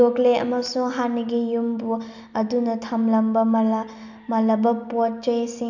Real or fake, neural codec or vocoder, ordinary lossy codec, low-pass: real; none; none; 7.2 kHz